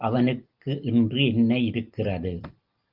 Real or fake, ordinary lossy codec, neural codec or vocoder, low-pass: real; Opus, 24 kbps; none; 5.4 kHz